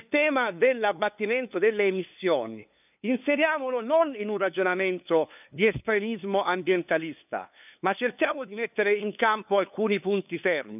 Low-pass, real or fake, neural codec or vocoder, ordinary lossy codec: 3.6 kHz; fake; codec, 16 kHz, 2 kbps, FunCodec, trained on LibriTTS, 25 frames a second; none